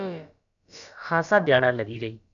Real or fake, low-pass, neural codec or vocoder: fake; 7.2 kHz; codec, 16 kHz, about 1 kbps, DyCAST, with the encoder's durations